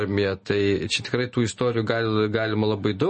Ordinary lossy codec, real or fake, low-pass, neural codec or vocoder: MP3, 32 kbps; real; 10.8 kHz; none